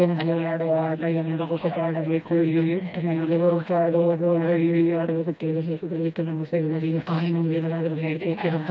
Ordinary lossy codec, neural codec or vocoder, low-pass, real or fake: none; codec, 16 kHz, 1 kbps, FreqCodec, smaller model; none; fake